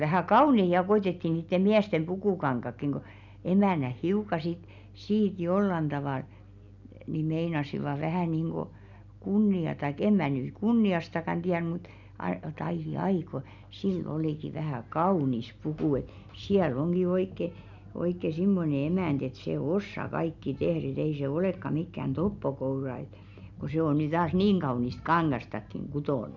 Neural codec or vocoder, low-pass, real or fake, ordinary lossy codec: none; 7.2 kHz; real; none